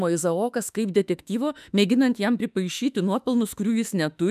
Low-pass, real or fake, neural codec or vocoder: 14.4 kHz; fake; autoencoder, 48 kHz, 32 numbers a frame, DAC-VAE, trained on Japanese speech